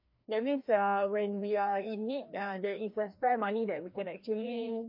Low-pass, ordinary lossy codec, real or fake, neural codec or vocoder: 5.4 kHz; MP3, 32 kbps; fake; codec, 16 kHz, 1 kbps, FreqCodec, larger model